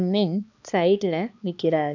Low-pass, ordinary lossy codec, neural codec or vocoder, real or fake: 7.2 kHz; none; codec, 16 kHz, 2 kbps, X-Codec, HuBERT features, trained on balanced general audio; fake